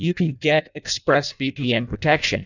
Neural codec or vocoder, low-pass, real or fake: codec, 16 kHz in and 24 kHz out, 0.6 kbps, FireRedTTS-2 codec; 7.2 kHz; fake